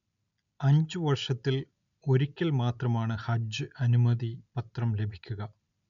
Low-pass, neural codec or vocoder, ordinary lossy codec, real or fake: 7.2 kHz; none; none; real